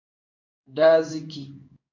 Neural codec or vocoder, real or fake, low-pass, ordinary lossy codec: codec, 16 kHz in and 24 kHz out, 1 kbps, XY-Tokenizer; fake; 7.2 kHz; MP3, 64 kbps